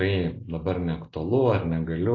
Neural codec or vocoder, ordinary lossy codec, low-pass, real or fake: none; AAC, 32 kbps; 7.2 kHz; real